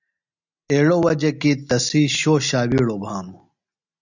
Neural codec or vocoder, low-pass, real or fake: none; 7.2 kHz; real